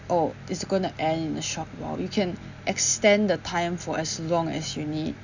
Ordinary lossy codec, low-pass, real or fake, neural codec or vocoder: none; 7.2 kHz; real; none